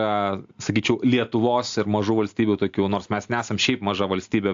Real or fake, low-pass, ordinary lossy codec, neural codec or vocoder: real; 7.2 kHz; AAC, 64 kbps; none